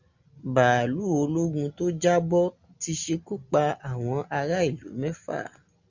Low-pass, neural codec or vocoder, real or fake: 7.2 kHz; none; real